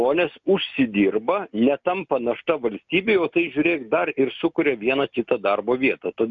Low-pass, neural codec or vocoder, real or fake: 7.2 kHz; none; real